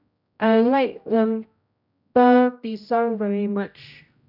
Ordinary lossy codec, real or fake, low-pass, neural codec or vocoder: none; fake; 5.4 kHz; codec, 16 kHz, 0.5 kbps, X-Codec, HuBERT features, trained on general audio